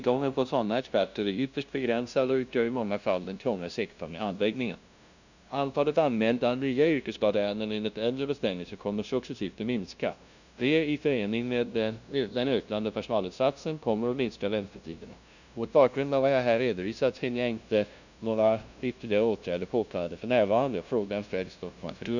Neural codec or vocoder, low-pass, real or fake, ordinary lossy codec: codec, 16 kHz, 0.5 kbps, FunCodec, trained on LibriTTS, 25 frames a second; 7.2 kHz; fake; none